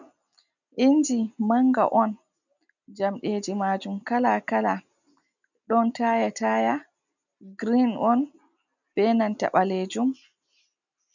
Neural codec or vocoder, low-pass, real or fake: none; 7.2 kHz; real